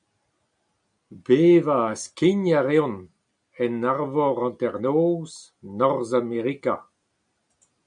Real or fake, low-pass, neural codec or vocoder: real; 9.9 kHz; none